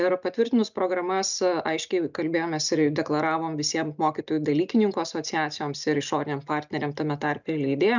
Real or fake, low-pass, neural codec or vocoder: real; 7.2 kHz; none